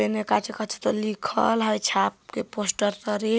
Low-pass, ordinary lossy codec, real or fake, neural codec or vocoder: none; none; real; none